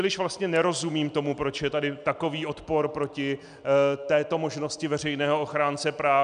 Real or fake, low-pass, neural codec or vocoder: real; 9.9 kHz; none